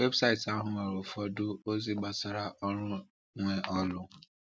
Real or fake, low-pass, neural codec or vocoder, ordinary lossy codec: real; none; none; none